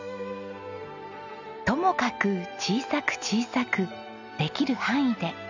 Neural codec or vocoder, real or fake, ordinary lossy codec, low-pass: none; real; none; 7.2 kHz